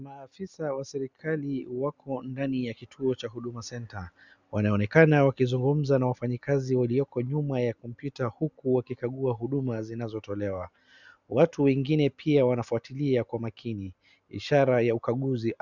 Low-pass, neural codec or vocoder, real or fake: 7.2 kHz; none; real